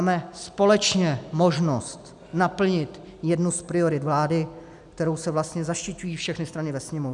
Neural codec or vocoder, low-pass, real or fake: none; 10.8 kHz; real